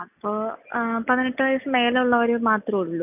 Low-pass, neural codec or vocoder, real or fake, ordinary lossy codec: 3.6 kHz; none; real; none